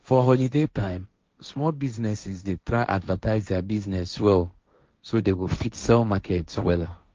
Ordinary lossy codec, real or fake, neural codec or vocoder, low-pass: Opus, 24 kbps; fake; codec, 16 kHz, 1.1 kbps, Voila-Tokenizer; 7.2 kHz